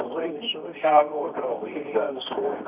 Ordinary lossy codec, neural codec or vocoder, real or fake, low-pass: none; codec, 24 kHz, 0.9 kbps, WavTokenizer, medium music audio release; fake; 3.6 kHz